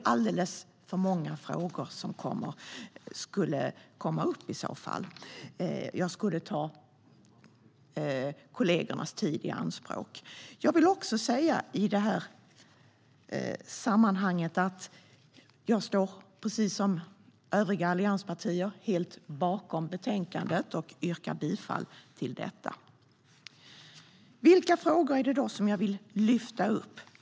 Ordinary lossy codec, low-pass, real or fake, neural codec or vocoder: none; none; real; none